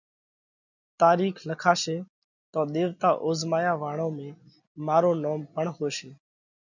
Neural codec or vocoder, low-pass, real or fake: none; 7.2 kHz; real